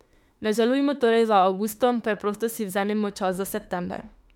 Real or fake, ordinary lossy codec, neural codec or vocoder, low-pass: fake; MP3, 96 kbps; autoencoder, 48 kHz, 32 numbers a frame, DAC-VAE, trained on Japanese speech; 19.8 kHz